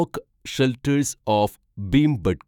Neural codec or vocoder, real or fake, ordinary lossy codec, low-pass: autoencoder, 48 kHz, 128 numbers a frame, DAC-VAE, trained on Japanese speech; fake; none; 19.8 kHz